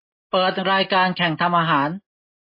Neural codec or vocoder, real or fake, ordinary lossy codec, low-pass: none; real; MP3, 24 kbps; 5.4 kHz